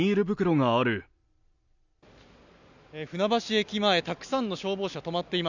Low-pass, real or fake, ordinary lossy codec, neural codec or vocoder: 7.2 kHz; real; none; none